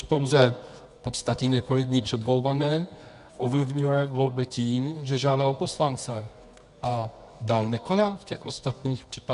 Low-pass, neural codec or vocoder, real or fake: 10.8 kHz; codec, 24 kHz, 0.9 kbps, WavTokenizer, medium music audio release; fake